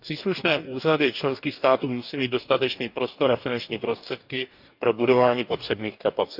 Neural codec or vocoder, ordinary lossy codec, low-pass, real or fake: codec, 44.1 kHz, 2.6 kbps, DAC; none; 5.4 kHz; fake